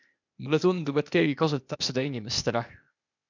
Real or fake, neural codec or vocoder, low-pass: fake; codec, 16 kHz, 0.8 kbps, ZipCodec; 7.2 kHz